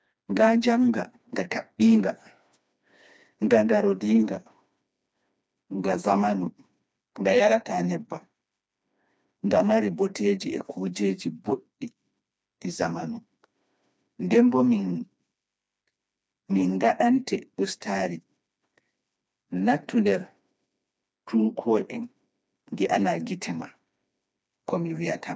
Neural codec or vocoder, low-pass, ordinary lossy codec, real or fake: codec, 16 kHz, 2 kbps, FreqCodec, smaller model; none; none; fake